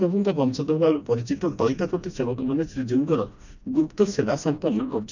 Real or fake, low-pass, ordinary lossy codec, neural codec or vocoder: fake; 7.2 kHz; none; codec, 16 kHz, 1 kbps, FreqCodec, smaller model